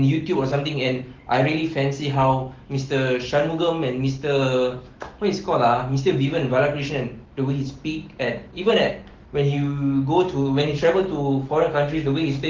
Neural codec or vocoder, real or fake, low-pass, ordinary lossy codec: autoencoder, 48 kHz, 128 numbers a frame, DAC-VAE, trained on Japanese speech; fake; 7.2 kHz; Opus, 16 kbps